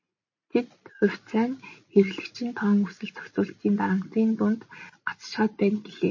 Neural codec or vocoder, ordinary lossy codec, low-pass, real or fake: none; MP3, 32 kbps; 7.2 kHz; real